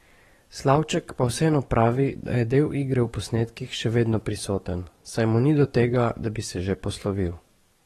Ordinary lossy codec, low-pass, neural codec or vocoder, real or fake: AAC, 32 kbps; 19.8 kHz; codec, 44.1 kHz, 7.8 kbps, DAC; fake